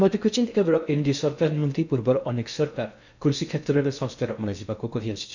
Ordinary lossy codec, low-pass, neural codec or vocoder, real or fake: none; 7.2 kHz; codec, 16 kHz in and 24 kHz out, 0.6 kbps, FocalCodec, streaming, 2048 codes; fake